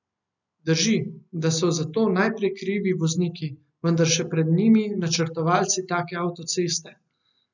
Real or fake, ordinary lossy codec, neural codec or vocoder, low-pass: real; none; none; 7.2 kHz